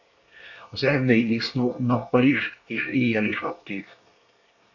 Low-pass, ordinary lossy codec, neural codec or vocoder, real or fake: 7.2 kHz; AAC, 48 kbps; codec, 24 kHz, 1 kbps, SNAC; fake